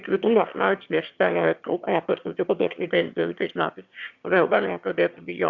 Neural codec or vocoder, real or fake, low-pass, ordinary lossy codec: autoencoder, 22.05 kHz, a latent of 192 numbers a frame, VITS, trained on one speaker; fake; 7.2 kHz; Opus, 64 kbps